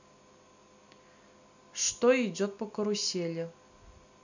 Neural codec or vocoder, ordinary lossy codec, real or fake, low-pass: none; none; real; 7.2 kHz